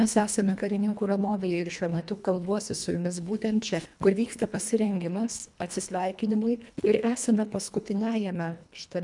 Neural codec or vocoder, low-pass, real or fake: codec, 24 kHz, 1.5 kbps, HILCodec; 10.8 kHz; fake